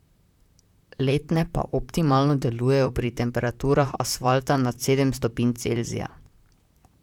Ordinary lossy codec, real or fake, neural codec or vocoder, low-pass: none; fake; vocoder, 44.1 kHz, 128 mel bands, Pupu-Vocoder; 19.8 kHz